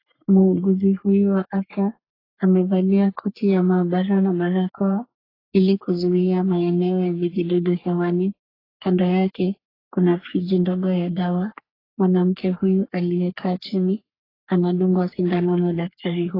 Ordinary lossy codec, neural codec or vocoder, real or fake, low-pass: AAC, 24 kbps; codec, 44.1 kHz, 3.4 kbps, Pupu-Codec; fake; 5.4 kHz